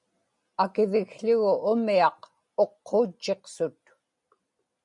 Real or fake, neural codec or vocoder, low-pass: real; none; 10.8 kHz